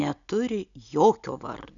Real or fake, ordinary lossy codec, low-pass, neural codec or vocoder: real; MP3, 96 kbps; 7.2 kHz; none